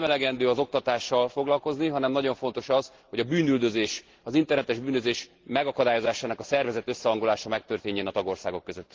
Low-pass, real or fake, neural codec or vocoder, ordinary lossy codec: 7.2 kHz; real; none; Opus, 16 kbps